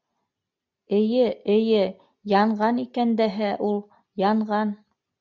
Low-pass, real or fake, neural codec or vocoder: 7.2 kHz; real; none